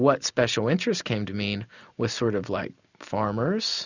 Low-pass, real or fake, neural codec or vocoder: 7.2 kHz; real; none